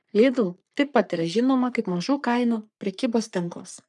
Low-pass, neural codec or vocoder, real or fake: 10.8 kHz; codec, 44.1 kHz, 3.4 kbps, Pupu-Codec; fake